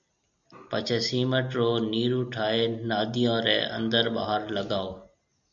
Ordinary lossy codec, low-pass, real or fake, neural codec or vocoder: MP3, 64 kbps; 7.2 kHz; real; none